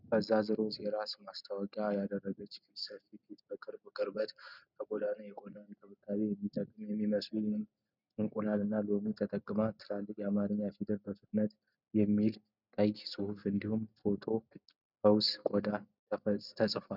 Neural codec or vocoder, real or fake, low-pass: none; real; 5.4 kHz